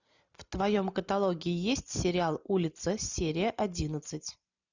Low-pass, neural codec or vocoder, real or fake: 7.2 kHz; none; real